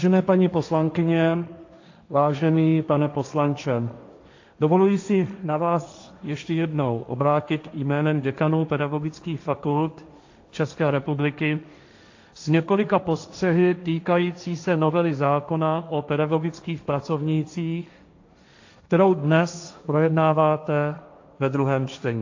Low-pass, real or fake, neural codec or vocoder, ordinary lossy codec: 7.2 kHz; fake; codec, 16 kHz, 1.1 kbps, Voila-Tokenizer; AAC, 48 kbps